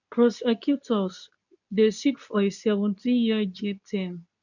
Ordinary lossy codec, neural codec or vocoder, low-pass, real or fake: none; codec, 24 kHz, 0.9 kbps, WavTokenizer, medium speech release version 2; 7.2 kHz; fake